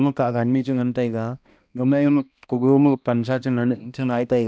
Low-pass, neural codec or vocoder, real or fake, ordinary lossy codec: none; codec, 16 kHz, 1 kbps, X-Codec, HuBERT features, trained on balanced general audio; fake; none